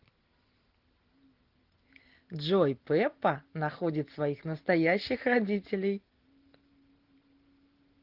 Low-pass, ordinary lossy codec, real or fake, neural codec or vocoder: 5.4 kHz; Opus, 16 kbps; real; none